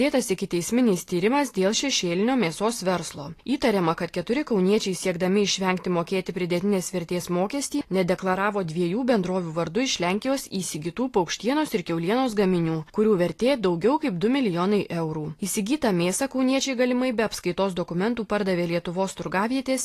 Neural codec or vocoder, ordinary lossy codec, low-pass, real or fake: none; AAC, 48 kbps; 14.4 kHz; real